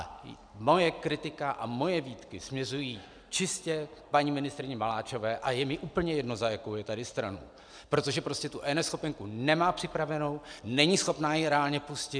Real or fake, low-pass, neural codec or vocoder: real; 9.9 kHz; none